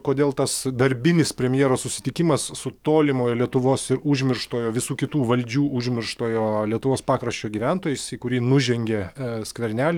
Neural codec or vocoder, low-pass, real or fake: codec, 44.1 kHz, 7.8 kbps, DAC; 19.8 kHz; fake